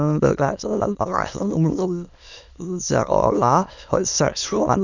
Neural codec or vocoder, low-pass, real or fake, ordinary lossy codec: autoencoder, 22.05 kHz, a latent of 192 numbers a frame, VITS, trained on many speakers; 7.2 kHz; fake; none